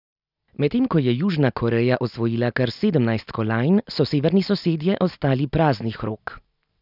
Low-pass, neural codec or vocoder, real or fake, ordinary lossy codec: 5.4 kHz; none; real; none